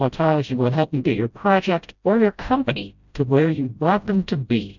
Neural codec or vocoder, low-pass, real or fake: codec, 16 kHz, 0.5 kbps, FreqCodec, smaller model; 7.2 kHz; fake